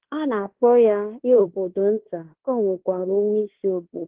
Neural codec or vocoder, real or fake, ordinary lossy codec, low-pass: codec, 16 kHz in and 24 kHz out, 0.9 kbps, LongCat-Audio-Codec, fine tuned four codebook decoder; fake; Opus, 16 kbps; 3.6 kHz